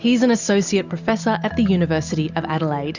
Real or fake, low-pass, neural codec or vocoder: real; 7.2 kHz; none